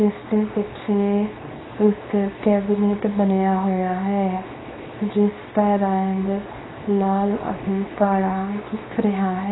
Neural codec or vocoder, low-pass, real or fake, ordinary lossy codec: codec, 24 kHz, 0.9 kbps, WavTokenizer, small release; 7.2 kHz; fake; AAC, 16 kbps